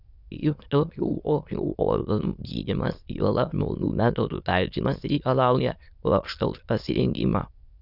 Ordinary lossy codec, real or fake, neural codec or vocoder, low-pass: Opus, 64 kbps; fake; autoencoder, 22.05 kHz, a latent of 192 numbers a frame, VITS, trained on many speakers; 5.4 kHz